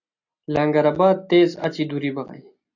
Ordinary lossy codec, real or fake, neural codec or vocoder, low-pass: AAC, 48 kbps; real; none; 7.2 kHz